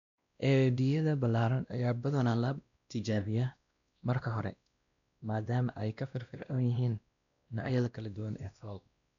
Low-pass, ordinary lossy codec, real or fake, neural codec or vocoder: 7.2 kHz; MP3, 96 kbps; fake; codec, 16 kHz, 1 kbps, X-Codec, WavLM features, trained on Multilingual LibriSpeech